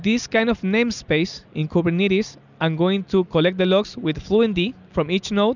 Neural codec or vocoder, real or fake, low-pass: none; real; 7.2 kHz